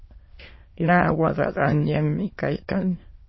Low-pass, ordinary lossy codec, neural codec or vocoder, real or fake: 7.2 kHz; MP3, 24 kbps; autoencoder, 22.05 kHz, a latent of 192 numbers a frame, VITS, trained on many speakers; fake